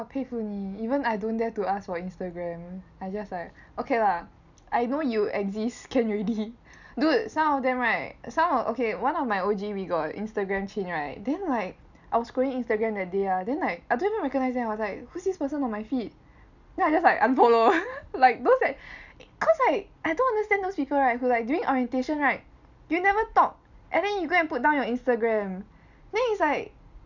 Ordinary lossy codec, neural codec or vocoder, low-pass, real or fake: none; none; 7.2 kHz; real